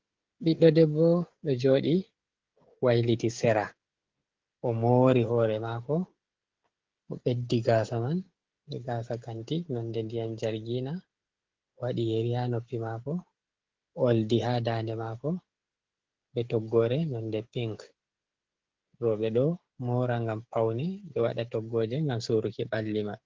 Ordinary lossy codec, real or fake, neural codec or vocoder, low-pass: Opus, 16 kbps; fake; codec, 16 kHz, 16 kbps, FreqCodec, smaller model; 7.2 kHz